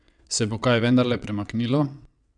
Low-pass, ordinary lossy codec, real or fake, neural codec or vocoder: 9.9 kHz; none; fake; vocoder, 22.05 kHz, 80 mel bands, WaveNeXt